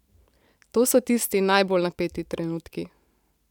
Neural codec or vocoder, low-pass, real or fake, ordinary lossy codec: vocoder, 44.1 kHz, 128 mel bands every 512 samples, BigVGAN v2; 19.8 kHz; fake; none